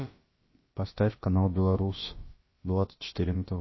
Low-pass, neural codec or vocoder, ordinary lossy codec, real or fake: 7.2 kHz; codec, 16 kHz, about 1 kbps, DyCAST, with the encoder's durations; MP3, 24 kbps; fake